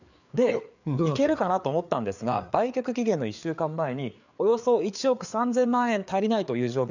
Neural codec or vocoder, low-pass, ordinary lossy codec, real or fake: codec, 16 kHz, 4 kbps, FreqCodec, larger model; 7.2 kHz; none; fake